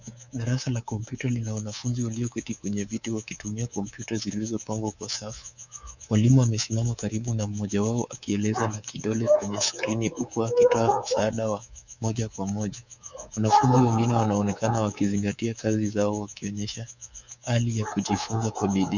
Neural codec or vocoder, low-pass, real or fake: codec, 24 kHz, 3.1 kbps, DualCodec; 7.2 kHz; fake